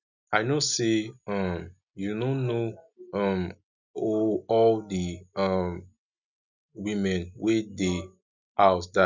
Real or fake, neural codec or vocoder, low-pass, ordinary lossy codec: real; none; 7.2 kHz; none